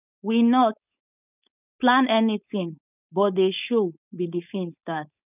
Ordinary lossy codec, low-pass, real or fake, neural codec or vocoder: none; 3.6 kHz; fake; codec, 16 kHz, 4.8 kbps, FACodec